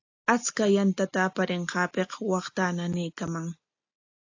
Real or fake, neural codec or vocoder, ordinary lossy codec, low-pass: real; none; AAC, 48 kbps; 7.2 kHz